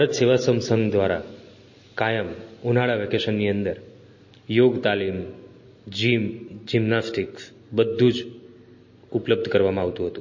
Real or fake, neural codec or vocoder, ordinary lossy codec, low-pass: real; none; MP3, 32 kbps; 7.2 kHz